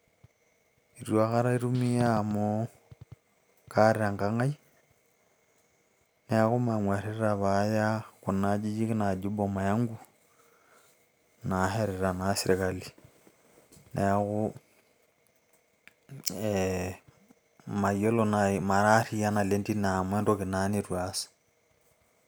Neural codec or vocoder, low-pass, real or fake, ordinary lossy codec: none; none; real; none